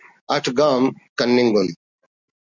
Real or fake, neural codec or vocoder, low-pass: real; none; 7.2 kHz